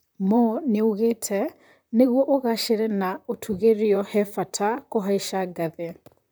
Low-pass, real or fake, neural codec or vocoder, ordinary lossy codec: none; fake; vocoder, 44.1 kHz, 128 mel bands, Pupu-Vocoder; none